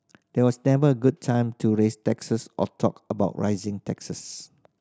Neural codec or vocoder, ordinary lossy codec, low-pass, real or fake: none; none; none; real